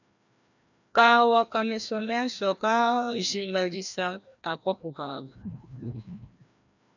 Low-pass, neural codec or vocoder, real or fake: 7.2 kHz; codec, 16 kHz, 1 kbps, FreqCodec, larger model; fake